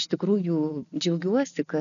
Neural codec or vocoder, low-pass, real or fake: none; 7.2 kHz; real